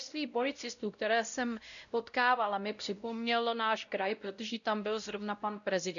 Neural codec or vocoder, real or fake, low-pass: codec, 16 kHz, 0.5 kbps, X-Codec, WavLM features, trained on Multilingual LibriSpeech; fake; 7.2 kHz